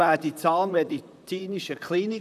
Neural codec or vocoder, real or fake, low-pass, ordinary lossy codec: vocoder, 44.1 kHz, 128 mel bands, Pupu-Vocoder; fake; 14.4 kHz; none